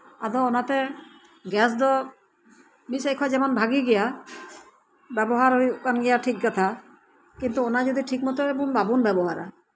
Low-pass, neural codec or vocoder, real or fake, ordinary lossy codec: none; none; real; none